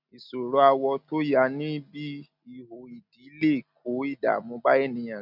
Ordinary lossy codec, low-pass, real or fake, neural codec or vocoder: none; 5.4 kHz; real; none